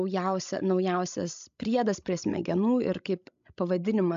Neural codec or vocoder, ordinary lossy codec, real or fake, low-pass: codec, 16 kHz, 16 kbps, FreqCodec, larger model; AAC, 64 kbps; fake; 7.2 kHz